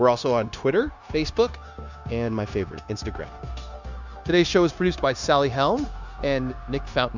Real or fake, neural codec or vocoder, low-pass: fake; codec, 16 kHz, 0.9 kbps, LongCat-Audio-Codec; 7.2 kHz